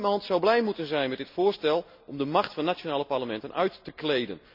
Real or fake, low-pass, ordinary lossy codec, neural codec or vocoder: real; 5.4 kHz; none; none